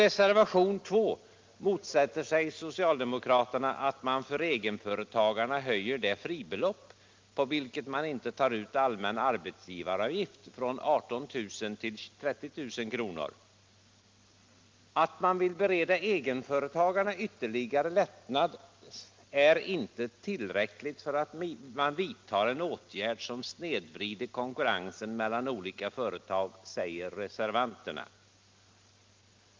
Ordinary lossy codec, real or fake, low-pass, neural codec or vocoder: Opus, 32 kbps; real; 7.2 kHz; none